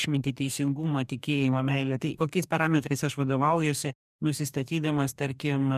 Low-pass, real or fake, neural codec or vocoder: 14.4 kHz; fake; codec, 44.1 kHz, 2.6 kbps, DAC